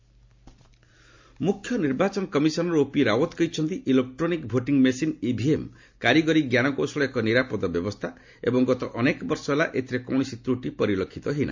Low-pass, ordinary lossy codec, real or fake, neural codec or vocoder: 7.2 kHz; MP3, 48 kbps; real; none